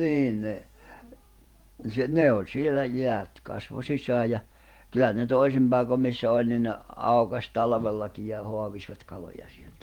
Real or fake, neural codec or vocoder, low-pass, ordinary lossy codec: fake; vocoder, 48 kHz, 128 mel bands, Vocos; 19.8 kHz; Opus, 24 kbps